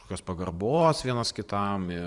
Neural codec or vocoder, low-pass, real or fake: vocoder, 44.1 kHz, 128 mel bands every 512 samples, BigVGAN v2; 10.8 kHz; fake